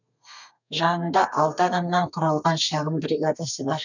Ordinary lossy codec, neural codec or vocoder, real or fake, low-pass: none; codec, 32 kHz, 1.9 kbps, SNAC; fake; 7.2 kHz